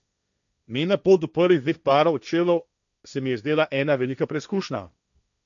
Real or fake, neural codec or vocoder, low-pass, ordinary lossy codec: fake; codec, 16 kHz, 1.1 kbps, Voila-Tokenizer; 7.2 kHz; none